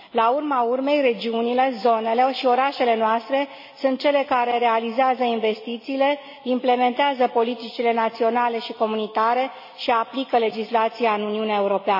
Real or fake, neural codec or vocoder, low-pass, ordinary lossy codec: real; none; 5.4 kHz; MP3, 24 kbps